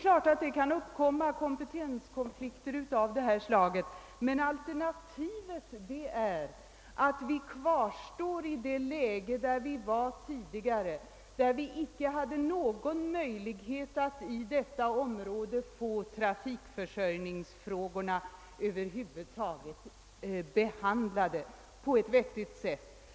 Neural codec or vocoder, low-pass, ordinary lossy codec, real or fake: none; none; none; real